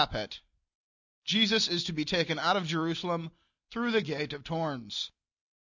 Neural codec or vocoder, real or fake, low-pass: none; real; 7.2 kHz